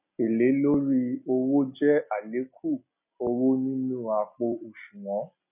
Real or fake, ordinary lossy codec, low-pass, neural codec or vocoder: real; none; 3.6 kHz; none